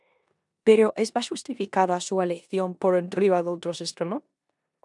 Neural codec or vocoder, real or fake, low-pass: codec, 16 kHz in and 24 kHz out, 0.9 kbps, LongCat-Audio-Codec, four codebook decoder; fake; 10.8 kHz